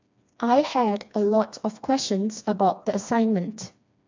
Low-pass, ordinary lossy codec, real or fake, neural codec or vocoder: 7.2 kHz; MP3, 64 kbps; fake; codec, 16 kHz, 2 kbps, FreqCodec, smaller model